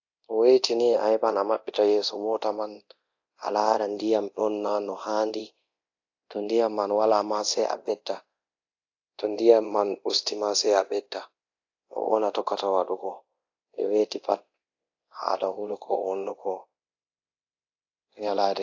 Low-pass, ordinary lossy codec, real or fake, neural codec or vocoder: 7.2 kHz; AAC, 48 kbps; fake; codec, 24 kHz, 0.9 kbps, DualCodec